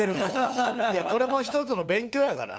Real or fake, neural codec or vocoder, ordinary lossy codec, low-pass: fake; codec, 16 kHz, 2 kbps, FunCodec, trained on LibriTTS, 25 frames a second; none; none